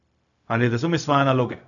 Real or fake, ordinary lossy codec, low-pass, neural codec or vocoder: fake; none; 7.2 kHz; codec, 16 kHz, 0.4 kbps, LongCat-Audio-Codec